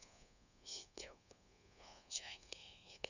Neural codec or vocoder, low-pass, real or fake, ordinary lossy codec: codec, 24 kHz, 1.2 kbps, DualCodec; 7.2 kHz; fake; none